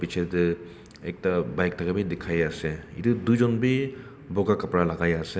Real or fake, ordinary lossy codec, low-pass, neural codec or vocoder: real; none; none; none